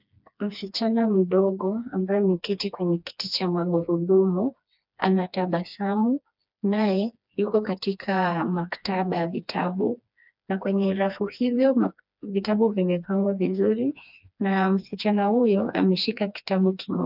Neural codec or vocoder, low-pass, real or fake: codec, 16 kHz, 2 kbps, FreqCodec, smaller model; 5.4 kHz; fake